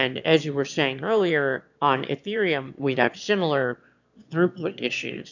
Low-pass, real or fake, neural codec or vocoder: 7.2 kHz; fake; autoencoder, 22.05 kHz, a latent of 192 numbers a frame, VITS, trained on one speaker